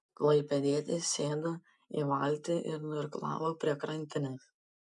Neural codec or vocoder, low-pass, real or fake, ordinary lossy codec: none; 10.8 kHz; real; MP3, 96 kbps